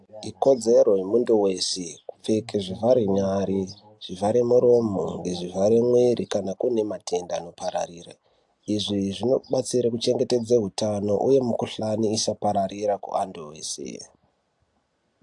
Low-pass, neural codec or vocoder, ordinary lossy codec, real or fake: 10.8 kHz; none; AAC, 64 kbps; real